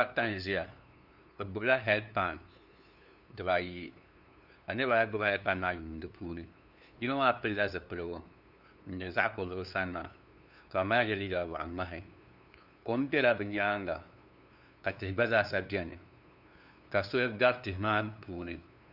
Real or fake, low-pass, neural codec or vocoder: fake; 5.4 kHz; codec, 16 kHz, 2 kbps, FunCodec, trained on LibriTTS, 25 frames a second